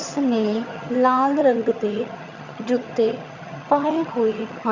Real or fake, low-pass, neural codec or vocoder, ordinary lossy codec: fake; 7.2 kHz; vocoder, 22.05 kHz, 80 mel bands, HiFi-GAN; Opus, 64 kbps